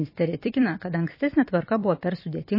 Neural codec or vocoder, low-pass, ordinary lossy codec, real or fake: vocoder, 44.1 kHz, 128 mel bands every 256 samples, BigVGAN v2; 5.4 kHz; MP3, 24 kbps; fake